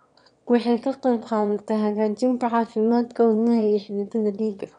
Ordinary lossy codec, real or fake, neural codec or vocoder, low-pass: none; fake; autoencoder, 22.05 kHz, a latent of 192 numbers a frame, VITS, trained on one speaker; 9.9 kHz